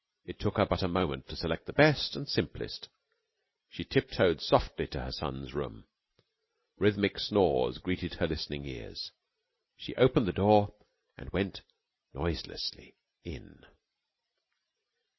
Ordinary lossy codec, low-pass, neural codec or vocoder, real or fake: MP3, 24 kbps; 7.2 kHz; none; real